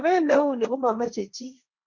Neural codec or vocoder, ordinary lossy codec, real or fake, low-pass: codec, 16 kHz, 1.1 kbps, Voila-Tokenizer; MP3, 64 kbps; fake; 7.2 kHz